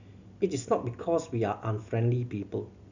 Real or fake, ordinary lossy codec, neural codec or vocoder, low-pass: real; none; none; 7.2 kHz